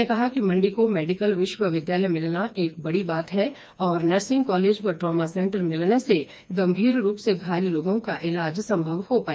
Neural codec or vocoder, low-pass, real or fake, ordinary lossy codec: codec, 16 kHz, 2 kbps, FreqCodec, smaller model; none; fake; none